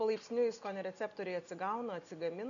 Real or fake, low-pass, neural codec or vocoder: real; 7.2 kHz; none